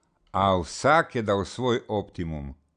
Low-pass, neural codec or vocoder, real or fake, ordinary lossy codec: 9.9 kHz; none; real; none